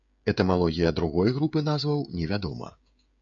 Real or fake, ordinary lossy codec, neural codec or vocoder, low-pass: fake; MP3, 64 kbps; codec, 16 kHz, 16 kbps, FreqCodec, smaller model; 7.2 kHz